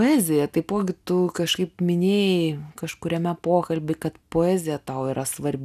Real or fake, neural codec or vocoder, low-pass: real; none; 14.4 kHz